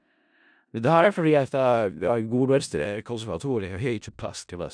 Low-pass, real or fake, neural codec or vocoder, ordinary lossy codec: 9.9 kHz; fake; codec, 16 kHz in and 24 kHz out, 0.4 kbps, LongCat-Audio-Codec, four codebook decoder; none